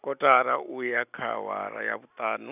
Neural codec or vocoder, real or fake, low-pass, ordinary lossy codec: none; real; 3.6 kHz; none